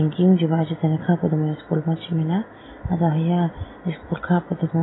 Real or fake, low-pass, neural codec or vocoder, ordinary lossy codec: real; 7.2 kHz; none; AAC, 16 kbps